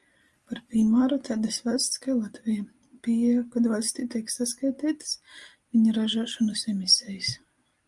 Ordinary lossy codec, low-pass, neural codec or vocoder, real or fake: Opus, 32 kbps; 10.8 kHz; none; real